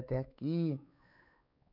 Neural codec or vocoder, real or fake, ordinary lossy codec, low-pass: codec, 16 kHz, 4 kbps, X-Codec, WavLM features, trained on Multilingual LibriSpeech; fake; none; 5.4 kHz